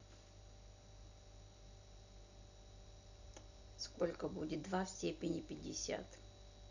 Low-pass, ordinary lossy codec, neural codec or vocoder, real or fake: 7.2 kHz; none; none; real